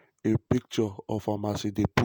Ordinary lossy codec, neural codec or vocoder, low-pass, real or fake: none; vocoder, 48 kHz, 128 mel bands, Vocos; none; fake